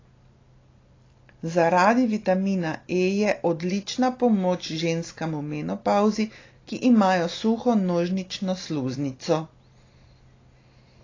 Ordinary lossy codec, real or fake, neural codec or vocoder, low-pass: AAC, 32 kbps; real; none; 7.2 kHz